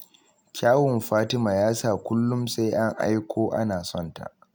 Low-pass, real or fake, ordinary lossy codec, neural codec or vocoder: none; real; none; none